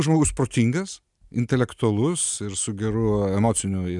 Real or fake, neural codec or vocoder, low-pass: real; none; 10.8 kHz